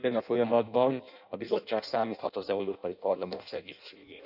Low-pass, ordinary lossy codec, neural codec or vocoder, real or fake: 5.4 kHz; none; codec, 16 kHz in and 24 kHz out, 0.6 kbps, FireRedTTS-2 codec; fake